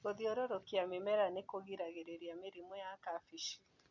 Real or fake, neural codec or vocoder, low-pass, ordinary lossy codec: real; none; 7.2 kHz; MP3, 48 kbps